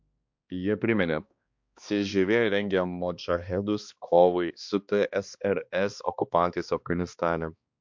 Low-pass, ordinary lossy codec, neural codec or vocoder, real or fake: 7.2 kHz; MP3, 48 kbps; codec, 16 kHz, 2 kbps, X-Codec, HuBERT features, trained on balanced general audio; fake